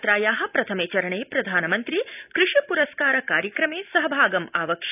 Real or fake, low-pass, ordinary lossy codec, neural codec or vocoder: real; 3.6 kHz; none; none